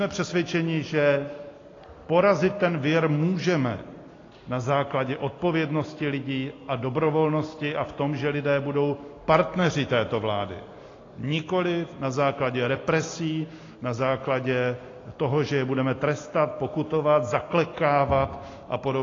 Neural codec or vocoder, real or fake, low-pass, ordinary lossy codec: none; real; 7.2 kHz; AAC, 32 kbps